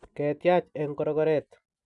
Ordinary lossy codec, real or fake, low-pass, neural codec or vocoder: none; real; 10.8 kHz; none